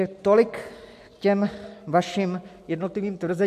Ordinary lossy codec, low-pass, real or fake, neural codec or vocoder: MP3, 64 kbps; 14.4 kHz; real; none